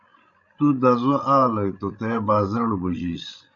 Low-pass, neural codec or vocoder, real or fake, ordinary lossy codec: 7.2 kHz; codec, 16 kHz, 16 kbps, FreqCodec, larger model; fake; MP3, 96 kbps